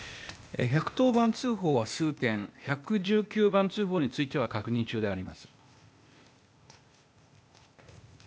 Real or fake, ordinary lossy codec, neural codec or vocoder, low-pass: fake; none; codec, 16 kHz, 0.8 kbps, ZipCodec; none